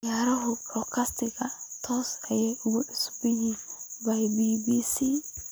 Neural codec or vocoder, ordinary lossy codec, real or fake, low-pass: none; none; real; none